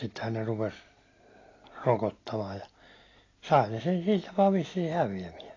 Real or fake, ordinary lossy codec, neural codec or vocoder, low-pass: real; AAC, 32 kbps; none; 7.2 kHz